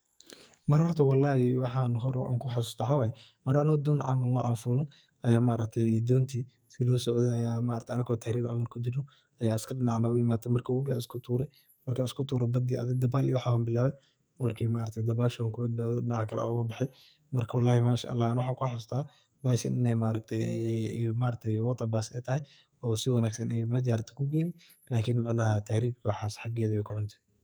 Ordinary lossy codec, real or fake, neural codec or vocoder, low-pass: none; fake; codec, 44.1 kHz, 2.6 kbps, SNAC; none